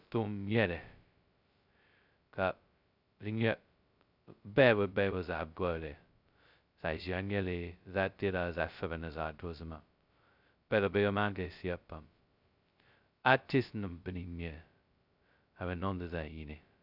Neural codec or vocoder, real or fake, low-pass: codec, 16 kHz, 0.2 kbps, FocalCodec; fake; 5.4 kHz